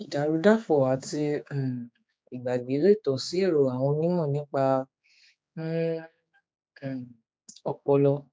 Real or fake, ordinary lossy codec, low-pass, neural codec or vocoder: fake; none; none; codec, 16 kHz, 4 kbps, X-Codec, HuBERT features, trained on general audio